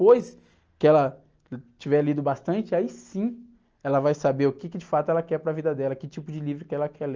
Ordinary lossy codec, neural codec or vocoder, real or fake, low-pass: Opus, 24 kbps; none; real; 7.2 kHz